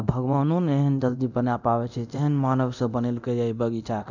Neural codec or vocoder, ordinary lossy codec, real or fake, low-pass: codec, 24 kHz, 0.9 kbps, DualCodec; none; fake; 7.2 kHz